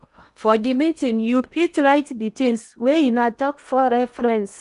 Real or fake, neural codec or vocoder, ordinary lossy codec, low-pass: fake; codec, 16 kHz in and 24 kHz out, 0.8 kbps, FocalCodec, streaming, 65536 codes; none; 10.8 kHz